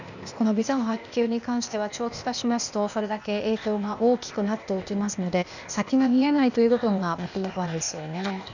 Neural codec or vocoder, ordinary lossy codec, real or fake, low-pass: codec, 16 kHz, 0.8 kbps, ZipCodec; none; fake; 7.2 kHz